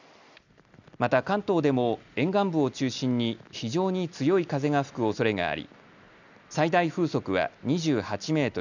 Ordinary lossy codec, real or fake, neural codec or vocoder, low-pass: none; real; none; 7.2 kHz